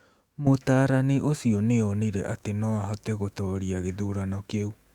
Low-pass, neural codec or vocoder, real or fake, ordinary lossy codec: 19.8 kHz; autoencoder, 48 kHz, 128 numbers a frame, DAC-VAE, trained on Japanese speech; fake; Opus, 64 kbps